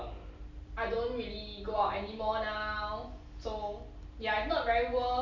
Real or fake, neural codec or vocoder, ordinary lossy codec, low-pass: real; none; none; 7.2 kHz